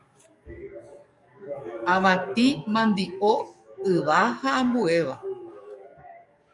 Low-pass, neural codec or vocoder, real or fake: 10.8 kHz; codec, 44.1 kHz, 7.8 kbps, DAC; fake